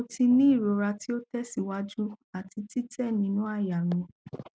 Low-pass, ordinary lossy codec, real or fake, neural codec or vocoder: none; none; real; none